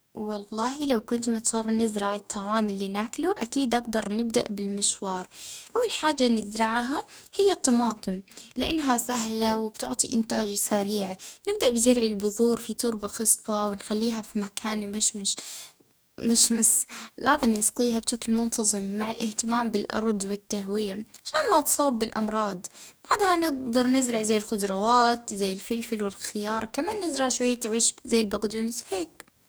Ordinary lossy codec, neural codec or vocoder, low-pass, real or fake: none; codec, 44.1 kHz, 2.6 kbps, DAC; none; fake